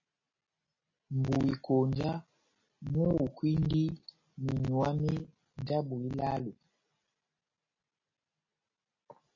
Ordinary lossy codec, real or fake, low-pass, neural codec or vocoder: MP3, 32 kbps; real; 7.2 kHz; none